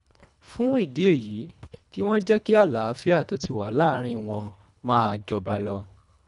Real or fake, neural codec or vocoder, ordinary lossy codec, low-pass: fake; codec, 24 kHz, 1.5 kbps, HILCodec; none; 10.8 kHz